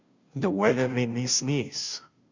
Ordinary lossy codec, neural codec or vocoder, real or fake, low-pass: Opus, 64 kbps; codec, 16 kHz, 0.5 kbps, FunCodec, trained on Chinese and English, 25 frames a second; fake; 7.2 kHz